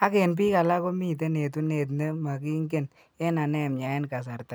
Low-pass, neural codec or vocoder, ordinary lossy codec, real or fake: none; none; none; real